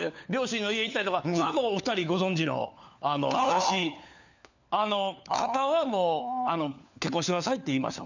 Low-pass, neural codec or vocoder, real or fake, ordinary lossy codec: 7.2 kHz; codec, 16 kHz, 4 kbps, FunCodec, trained on LibriTTS, 50 frames a second; fake; none